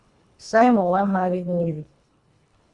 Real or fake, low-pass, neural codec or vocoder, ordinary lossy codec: fake; 10.8 kHz; codec, 24 kHz, 1.5 kbps, HILCodec; Opus, 64 kbps